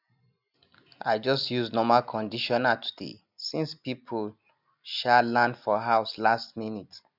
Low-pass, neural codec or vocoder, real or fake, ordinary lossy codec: 5.4 kHz; none; real; none